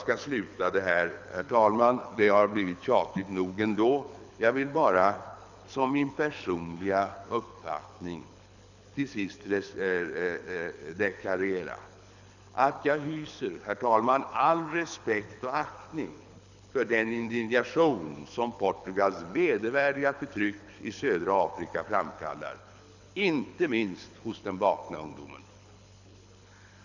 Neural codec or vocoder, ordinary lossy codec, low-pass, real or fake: codec, 24 kHz, 6 kbps, HILCodec; none; 7.2 kHz; fake